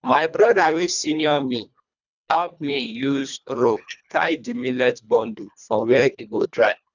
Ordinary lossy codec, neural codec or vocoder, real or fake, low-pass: none; codec, 24 kHz, 1.5 kbps, HILCodec; fake; 7.2 kHz